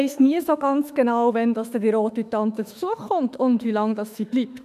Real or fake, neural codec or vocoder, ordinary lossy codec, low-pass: fake; autoencoder, 48 kHz, 32 numbers a frame, DAC-VAE, trained on Japanese speech; none; 14.4 kHz